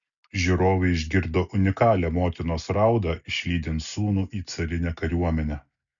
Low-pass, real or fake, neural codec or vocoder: 7.2 kHz; real; none